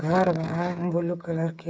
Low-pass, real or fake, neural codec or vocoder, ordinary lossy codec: none; fake; codec, 16 kHz, 8 kbps, FreqCodec, smaller model; none